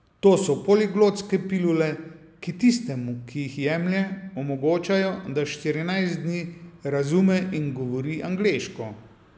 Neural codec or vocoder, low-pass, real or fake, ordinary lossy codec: none; none; real; none